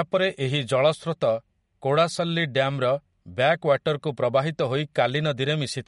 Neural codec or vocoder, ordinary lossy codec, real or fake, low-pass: none; MP3, 48 kbps; real; 19.8 kHz